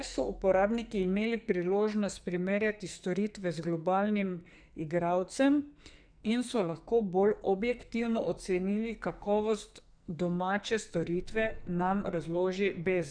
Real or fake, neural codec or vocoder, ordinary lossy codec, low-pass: fake; codec, 44.1 kHz, 2.6 kbps, SNAC; none; 9.9 kHz